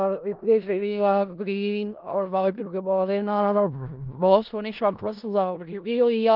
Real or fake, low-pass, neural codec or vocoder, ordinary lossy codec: fake; 5.4 kHz; codec, 16 kHz in and 24 kHz out, 0.4 kbps, LongCat-Audio-Codec, four codebook decoder; Opus, 32 kbps